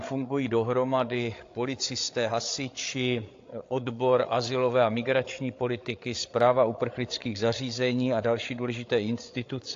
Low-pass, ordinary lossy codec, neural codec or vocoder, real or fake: 7.2 kHz; AAC, 48 kbps; codec, 16 kHz, 8 kbps, FreqCodec, larger model; fake